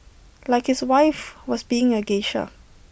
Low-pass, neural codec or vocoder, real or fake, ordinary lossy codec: none; none; real; none